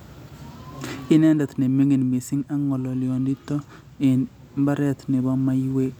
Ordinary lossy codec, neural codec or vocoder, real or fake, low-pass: none; none; real; 19.8 kHz